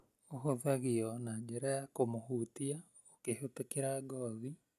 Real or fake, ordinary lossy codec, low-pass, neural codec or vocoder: real; none; 14.4 kHz; none